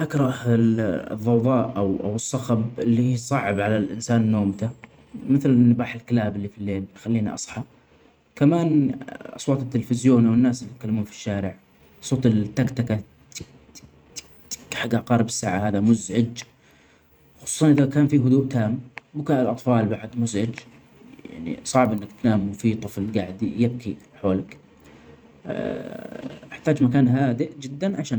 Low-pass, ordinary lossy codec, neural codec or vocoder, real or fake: none; none; vocoder, 44.1 kHz, 128 mel bands every 512 samples, BigVGAN v2; fake